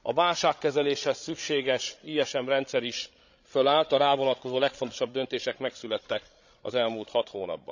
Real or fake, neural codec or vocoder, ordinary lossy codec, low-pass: fake; codec, 16 kHz, 16 kbps, FreqCodec, larger model; none; 7.2 kHz